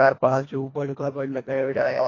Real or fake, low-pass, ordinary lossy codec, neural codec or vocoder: fake; 7.2 kHz; AAC, 32 kbps; codec, 24 kHz, 1.5 kbps, HILCodec